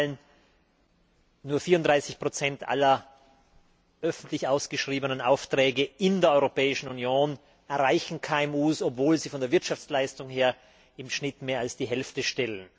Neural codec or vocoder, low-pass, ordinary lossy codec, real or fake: none; none; none; real